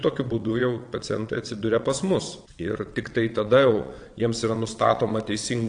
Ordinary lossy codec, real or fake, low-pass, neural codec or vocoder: AAC, 64 kbps; fake; 9.9 kHz; vocoder, 22.05 kHz, 80 mel bands, WaveNeXt